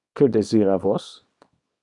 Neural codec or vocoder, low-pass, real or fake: codec, 24 kHz, 0.9 kbps, WavTokenizer, small release; 10.8 kHz; fake